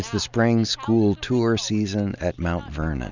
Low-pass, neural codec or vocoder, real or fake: 7.2 kHz; none; real